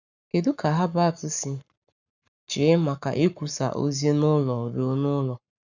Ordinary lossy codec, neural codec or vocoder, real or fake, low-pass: none; codec, 44.1 kHz, 7.8 kbps, Pupu-Codec; fake; 7.2 kHz